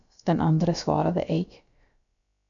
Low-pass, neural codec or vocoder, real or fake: 7.2 kHz; codec, 16 kHz, about 1 kbps, DyCAST, with the encoder's durations; fake